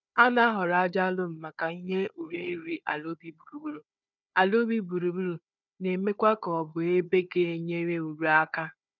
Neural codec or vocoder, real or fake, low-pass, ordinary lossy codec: codec, 16 kHz, 4 kbps, FunCodec, trained on Chinese and English, 50 frames a second; fake; 7.2 kHz; none